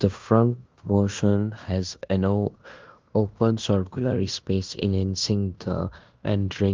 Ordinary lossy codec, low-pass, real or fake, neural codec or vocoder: Opus, 24 kbps; 7.2 kHz; fake; codec, 16 kHz in and 24 kHz out, 0.9 kbps, LongCat-Audio-Codec, fine tuned four codebook decoder